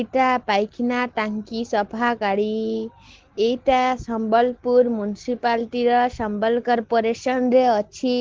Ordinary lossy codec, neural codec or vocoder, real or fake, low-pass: Opus, 16 kbps; none; real; 7.2 kHz